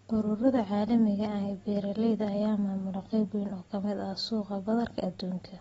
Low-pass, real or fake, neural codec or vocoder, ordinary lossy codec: 19.8 kHz; real; none; AAC, 24 kbps